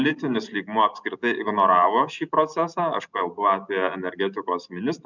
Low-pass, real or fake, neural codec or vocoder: 7.2 kHz; real; none